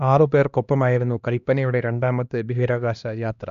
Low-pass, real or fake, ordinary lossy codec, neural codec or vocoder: 7.2 kHz; fake; none; codec, 16 kHz, 1 kbps, X-Codec, HuBERT features, trained on LibriSpeech